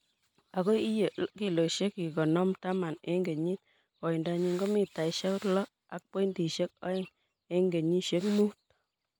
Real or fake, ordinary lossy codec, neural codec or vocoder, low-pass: real; none; none; none